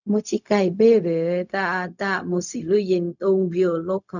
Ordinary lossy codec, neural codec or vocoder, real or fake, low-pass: none; codec, 16 kHz, 0.4 kbps, LongCat-Audio-Codec; fake; 7.2 kHz